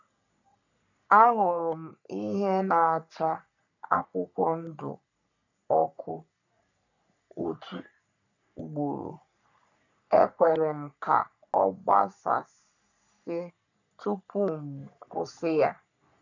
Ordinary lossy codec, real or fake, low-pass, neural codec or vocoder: none; fake; 7.2 kHz; codec, 44.1 kHz, 3.4 kbps, Pupu-Codec